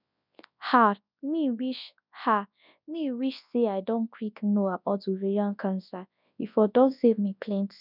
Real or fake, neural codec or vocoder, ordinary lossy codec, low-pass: fake; codec, 24 kHz, 0.9 kbps, WavTokenizer, large speech release; none; 5.4 kHz